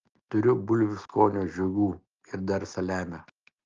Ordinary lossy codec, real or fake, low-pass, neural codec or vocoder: Opus, 24 kbps; real; 7.2 kHz; none